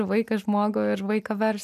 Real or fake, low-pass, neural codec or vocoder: real; 14.4 kHz; none